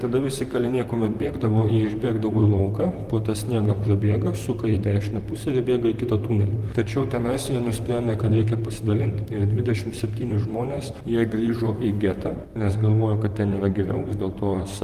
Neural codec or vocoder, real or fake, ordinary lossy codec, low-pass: vocoder, 44.1 kHz, 128 mel bands, Pupu-Vocoder; fake; Opus, 32 kbps; 14.4 kHz